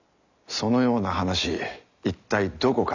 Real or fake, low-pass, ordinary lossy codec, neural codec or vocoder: real; 7.2 kHz; none; none